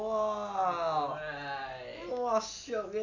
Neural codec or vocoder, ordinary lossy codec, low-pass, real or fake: none; none; 7.2 kHz; real